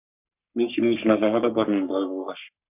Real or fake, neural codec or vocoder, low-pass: fake; codec, 44.1 kHz, 3.4 kbps, Pupu-Codec; 3.6 kHz